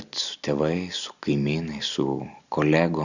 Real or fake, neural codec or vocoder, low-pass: real; none; 7.2 kHz